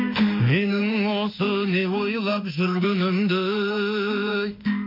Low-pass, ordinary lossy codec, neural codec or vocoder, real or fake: 5.4 kHz; MP3, 32 kbps; autoencoder, 48 kHz, 32 numbers a frame, DAC-VAE, trained on Japanese speech; fake